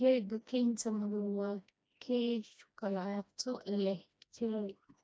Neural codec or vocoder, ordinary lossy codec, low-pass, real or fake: codec, 16 kHz, 1 kbps, FreqCodec, smaller model; none; none; fake